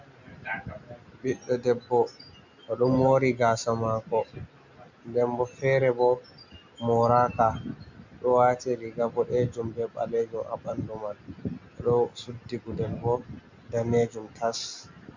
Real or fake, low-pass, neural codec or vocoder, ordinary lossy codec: real; 7.2 kHz; none; MP3, 64 kbps